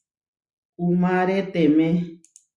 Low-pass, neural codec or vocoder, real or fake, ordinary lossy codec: 10.8 kHz; none; real; Opus, 64 kbps